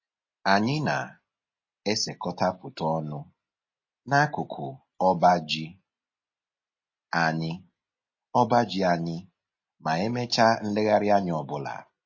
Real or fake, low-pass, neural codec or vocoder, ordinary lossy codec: real; 7.2 kHz; none; MP3, 32 kbps